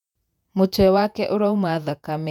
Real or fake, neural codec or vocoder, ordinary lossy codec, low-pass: fake; vocoder, 48 kHz, 128 mel bands, Vocos; none; 19.8 kHz